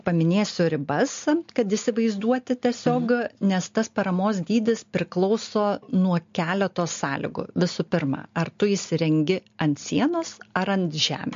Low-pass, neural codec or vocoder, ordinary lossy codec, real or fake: 7.2 kHz; none; MP3, 48 kbps; real